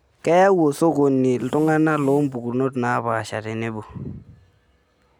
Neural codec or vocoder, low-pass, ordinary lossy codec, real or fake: none; 19.8 kHz; none; real